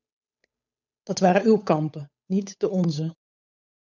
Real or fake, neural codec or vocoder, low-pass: fake; codec, 16 kHz, 8 kbps, FunCodec, trained on Chinese and English, 25 frames a second; 7.2 kHz